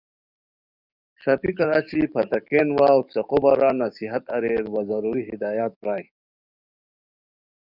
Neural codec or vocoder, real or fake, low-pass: codec, 44.1 kHz, 7.8 kbps, DAC; fake; 5.4 kHz